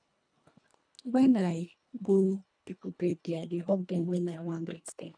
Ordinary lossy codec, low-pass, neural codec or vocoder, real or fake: none; 9.9 kHz; codec, 24 kHz, 1.5 kbps, HILCodec; fake